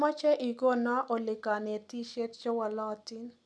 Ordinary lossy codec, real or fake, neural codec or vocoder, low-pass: none; real; none; none